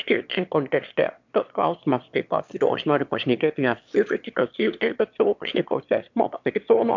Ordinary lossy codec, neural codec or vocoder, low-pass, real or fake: MP3, 64 kbps; autoencoder, 22.05 kHz, a latent of 192 numbers a frame, VITS, trained on one speaker; 7.2 kHz; fake